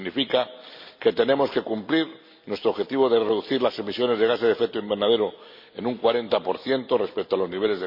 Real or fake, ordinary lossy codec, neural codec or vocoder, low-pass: real; MP3, 32 kbps; none; 5.4 kHz